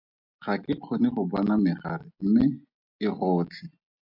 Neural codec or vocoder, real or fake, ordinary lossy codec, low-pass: none; real; MP3, 48 kbps; 5.4 kHz